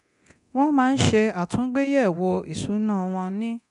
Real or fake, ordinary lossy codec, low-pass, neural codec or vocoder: fake; none; 10.8 kHz; codec, 24 kHz, 0.9 kbps, DualCodec